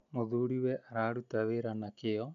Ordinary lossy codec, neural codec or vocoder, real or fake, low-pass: none; none; real; 7.2 kHz